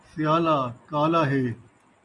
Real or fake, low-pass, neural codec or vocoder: real; 10.8 kHz; none